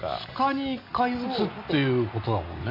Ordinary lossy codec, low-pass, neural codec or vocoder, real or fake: MP3, 32 kbps; 5.4 kHz; none; real